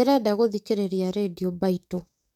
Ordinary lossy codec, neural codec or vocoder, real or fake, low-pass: none; codec, 44.1 kHz, 7.8 kbps, DAC; fake; 19.8 kHz